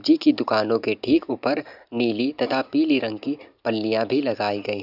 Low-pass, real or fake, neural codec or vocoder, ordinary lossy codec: 5.4 kHz; real; none; none